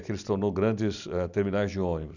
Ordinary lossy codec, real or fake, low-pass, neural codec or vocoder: none; real; 7.2 kHz; none